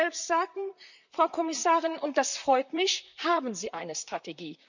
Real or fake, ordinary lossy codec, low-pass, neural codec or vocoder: fake; none; 7.2 kHz; vocoder, 44.1 kHz, 128 mel bands, Pupu-Vocoder